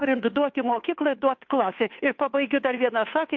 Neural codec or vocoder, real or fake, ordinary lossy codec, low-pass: codec, 16 kHz, 2 kbps, FunCodec, trained on Chinese and English, 25 frames a second; fake; MP3, 48 kbps; 7.2 kHz